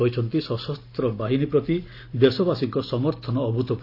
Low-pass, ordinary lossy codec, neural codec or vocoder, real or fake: 5.4 kHz; AAC, 32 kbps; none; real